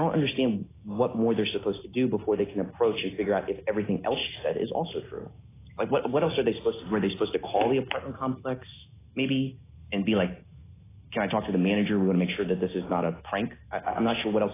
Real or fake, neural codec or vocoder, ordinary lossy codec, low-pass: real; none; AAC, 16 kbps; 3.6 kHz